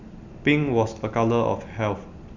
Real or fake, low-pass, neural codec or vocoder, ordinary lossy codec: real; 7.2 kHz; none; none